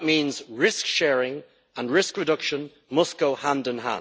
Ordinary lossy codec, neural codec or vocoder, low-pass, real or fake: none; none; none; real